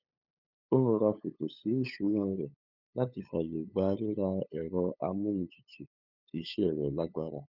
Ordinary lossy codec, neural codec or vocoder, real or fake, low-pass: none; codec, 16 kHz, 8 kbps, FunCodec, trained on LibriTTS, 25 frames a second; fake; 5.4 kHz